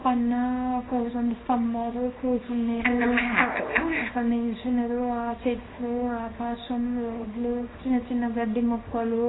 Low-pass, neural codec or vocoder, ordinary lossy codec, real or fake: 7.2 kHz; codec, 24 kHz, 0.9 kbps, WavTokenizer, small release; AAC, 16 kbps; fake